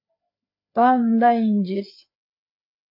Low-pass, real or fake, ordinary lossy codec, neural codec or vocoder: 5.4 kHz; fake; MP3, 32 kbps; codec, 16 kHz, 4 kbps, FreqCodec, larger model